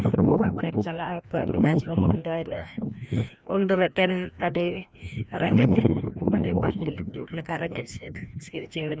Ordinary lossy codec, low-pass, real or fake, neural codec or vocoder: none; none; fake; codec, 16 kHz, 1 kbps, FreqCodec, larger model